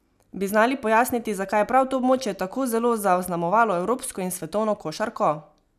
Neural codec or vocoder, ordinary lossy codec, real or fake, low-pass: none; none; real; 14.4 kHz